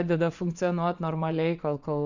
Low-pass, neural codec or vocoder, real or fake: 7.2 kHz; vocoder, 22.05 kHz, 80 mel bands, WaveNeXt; fake